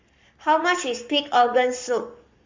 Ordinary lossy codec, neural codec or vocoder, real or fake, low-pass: MP3, 48 kbps; codec, 44.1 kHz, 7.8 kbps, Pupu-Codec; fake; 7.2 kHz